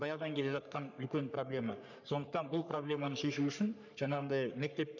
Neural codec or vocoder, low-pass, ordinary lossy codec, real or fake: codec, 44.1 kHz, 3.4 kbps, Pupu-Codec; 7.2 kHz; none; fake